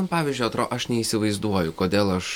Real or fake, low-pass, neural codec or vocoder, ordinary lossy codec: real; 19.8 kHz; none; MP3, 96 kbps